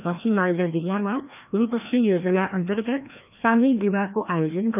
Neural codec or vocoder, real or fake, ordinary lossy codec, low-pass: codec, 16 kHz, 1 kbps, FreqCodec, larger model; fake; none; 3.6 kHz